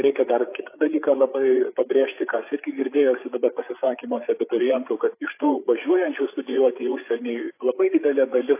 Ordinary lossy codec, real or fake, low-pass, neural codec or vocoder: AAC, 24 kbps; fake; 3.6 kHz; codec, 16 kHz, 8 kbps, FreqCodec, larger model